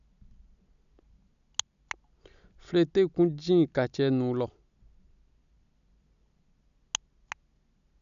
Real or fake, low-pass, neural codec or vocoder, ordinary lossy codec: real; 7.2 kHz; none; none